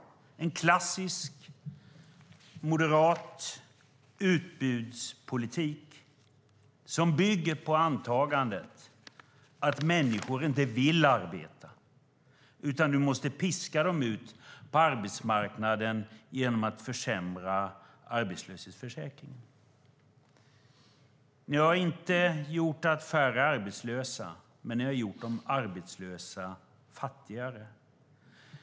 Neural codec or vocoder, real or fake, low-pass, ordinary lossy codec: none; real; none; none